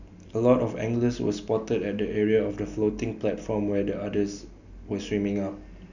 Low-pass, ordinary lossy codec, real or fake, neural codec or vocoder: 7.2 kHz; none; real; none